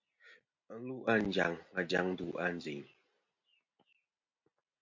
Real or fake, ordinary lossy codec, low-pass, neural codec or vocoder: real; MP3, 48 kbps; 7.2 kHz; none